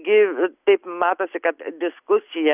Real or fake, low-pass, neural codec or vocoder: real; 3.6 kHz; none